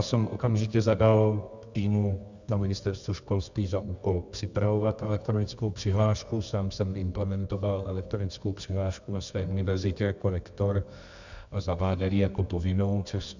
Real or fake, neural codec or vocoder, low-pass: fake; codec, 24 kHz, 0.9 kbps, WavTokenizer, medium music audio release; 7.2 kHz